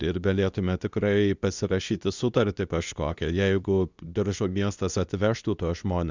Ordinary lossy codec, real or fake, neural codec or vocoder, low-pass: Opus, 64 kbps; fake; codec, 24 kHz, 0.9 kbps, WavTokenizer, medium speech release version 2; 7.2 kHz